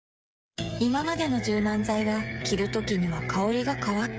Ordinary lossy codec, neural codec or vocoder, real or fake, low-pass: none; codec, 16 kHz, 8 kbps, FreqCodec, smaller model; fake; none